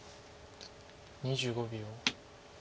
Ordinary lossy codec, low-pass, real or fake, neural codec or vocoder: none; none; real; none